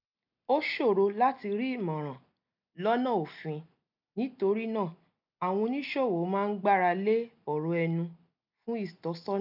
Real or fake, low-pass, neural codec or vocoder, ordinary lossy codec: real; 5.4 kHz; none; none